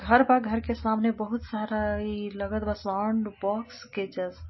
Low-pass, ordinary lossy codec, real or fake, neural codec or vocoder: 7.2 kHz; MP3, 24 kbps; real; none